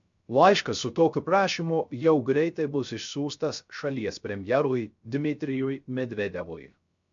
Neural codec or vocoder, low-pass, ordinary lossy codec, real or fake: codec, 16 kHz, 0.3 kbps, FocalCodec; 7.2 kHz; MP3, 64 kbps; fake